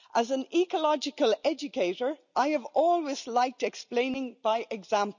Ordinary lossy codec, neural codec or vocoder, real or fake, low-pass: none; none; real; 7.2 kHz